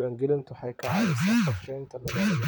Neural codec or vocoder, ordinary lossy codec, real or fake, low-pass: vocoder, 44.1 kHz, 128 mel bands, Pupu-Vocoder; none; fake; none